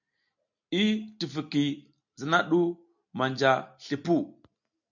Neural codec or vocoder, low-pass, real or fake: none; 7.2 kHz; real